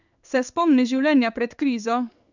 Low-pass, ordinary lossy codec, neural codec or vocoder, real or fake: 7.2 kHz; none; codec, 16 kHz in and 24 kHz out, 1 kbps, XY-Tokenizer; fake